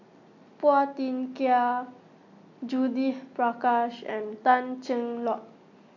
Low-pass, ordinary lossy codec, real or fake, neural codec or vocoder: 7.2 kHz; AAC, 48 kbps; real; none